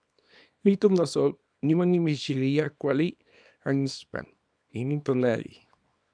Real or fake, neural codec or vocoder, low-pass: fake; codec, 24 kHz, 0.9 kbps, WavTokenizer, small release; 9.9 kHz